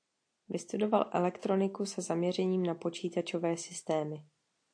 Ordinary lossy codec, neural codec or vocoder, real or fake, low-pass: AAC, 48 kbps; none; real; 9.9 kHz